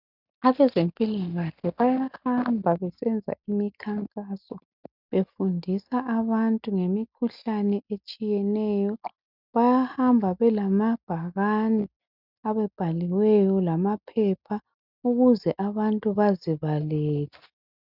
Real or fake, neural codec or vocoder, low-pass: real; none; 5.4 kHz